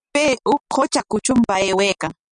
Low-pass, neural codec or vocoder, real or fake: 9.9 kHz; none; real